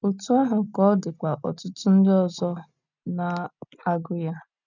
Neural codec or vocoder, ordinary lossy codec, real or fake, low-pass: none; none; real; 7.2 kHz